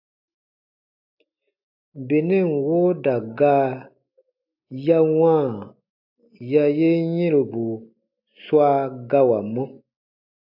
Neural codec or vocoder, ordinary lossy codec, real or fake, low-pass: none; AAC, 48 kbps; real; 5.4 kHz